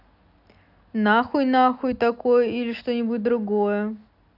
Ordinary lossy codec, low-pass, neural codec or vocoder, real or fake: none; 5.4 kHz; none; real